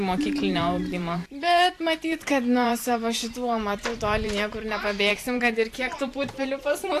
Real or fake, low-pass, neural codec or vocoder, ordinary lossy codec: fake; 14.4 kHz; vocoder, 44.1 kHz, 128 mel bands every 512 samples, BigVGAN v2; AAC, 64 kbps